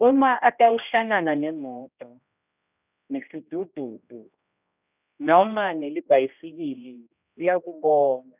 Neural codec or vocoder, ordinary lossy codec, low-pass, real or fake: codec, 16 kHz, 1 kbps, X-Codec, HuBERT features, trained on general audio; none; 3.6 kHz; fake